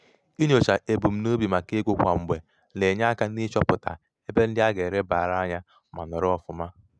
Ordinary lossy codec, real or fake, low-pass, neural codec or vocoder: none; real; none; none